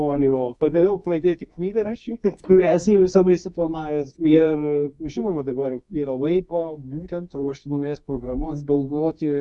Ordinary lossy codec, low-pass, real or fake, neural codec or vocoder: MP3, 64 kbps; 10.8 kHz; fake; codec, 24 kHz, 0.9 kbps, WavTokenizer, medium music audio release